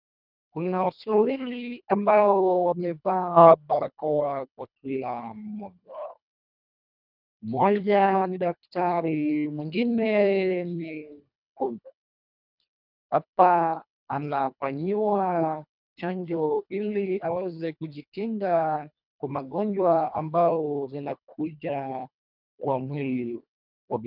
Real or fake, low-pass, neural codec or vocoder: fake; 5.4 kHz; codec, 24 kHz, 1.5 kbps, HILCodec